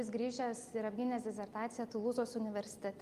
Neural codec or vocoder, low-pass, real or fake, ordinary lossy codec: none; 14.4 kHz; real; Opus, 32 kbps